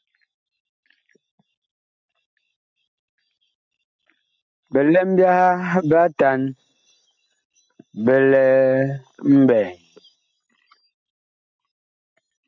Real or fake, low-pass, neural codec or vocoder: real; 7.2 kHz; none